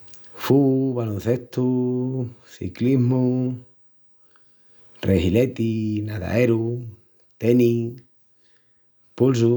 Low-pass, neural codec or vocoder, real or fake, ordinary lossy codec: none; none; real; none